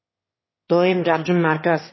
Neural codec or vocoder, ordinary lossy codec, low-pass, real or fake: autoencoder, 22.05 kHz, a latent of 192 numbers a frame, VITS, trained on one speaker; MP3, 24 kbps; 7.2 kHz; fake